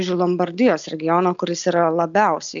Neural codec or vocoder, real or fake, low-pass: none; real; 7.2 kHz